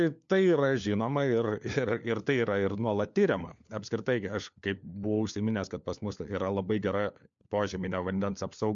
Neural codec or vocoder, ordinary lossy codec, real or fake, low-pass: codec, 16 kHz, 4 kbps, FunCodec, trained on LibriTTS, 50 frames a second; MP3, 64 kbps; fake; 7.2 kHz